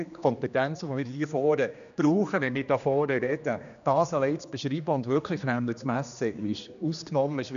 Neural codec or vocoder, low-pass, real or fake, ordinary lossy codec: codec, 16 kHz, 2 kbps, X-Codec, HuBERT features, trained on general audio; 7.2 kHz; fake; none